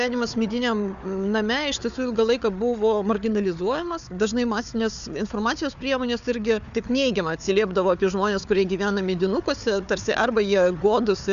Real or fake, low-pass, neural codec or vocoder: fake; 7.2 kHz; codec, 16 kHz, 4 kbps, FunCodec, trained on Chinese and English, 50 frames a second